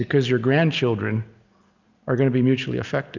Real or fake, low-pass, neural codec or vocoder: real; 7.2 kHz; none